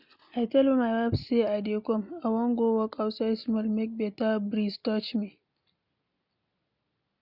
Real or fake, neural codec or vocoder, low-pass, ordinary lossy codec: real; none; 5.4 kHz; none